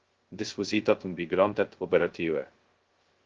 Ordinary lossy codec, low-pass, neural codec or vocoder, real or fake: Opus, 16 kbps; 7.2 kHz; codec, 16 kHz, 0.2 kbps, FocalCodec; fake